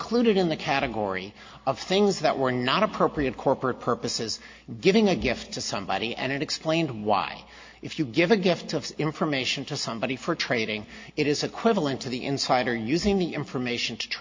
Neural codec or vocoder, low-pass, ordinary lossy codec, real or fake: none; 7.2 kHz; MP3, 48 kbps; real